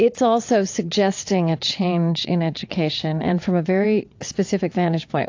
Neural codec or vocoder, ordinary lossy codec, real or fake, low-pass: vocoder, 22.05 kHz, 80 mel bands, WaveNeXt; AAC, 48 kbps; fake; 7.2 kHz